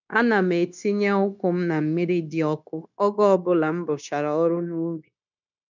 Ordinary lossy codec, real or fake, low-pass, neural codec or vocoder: none; fake; 7.2 kHz; codec, 16 kHz, 0.9 kbps, LongCat-Audio-Codec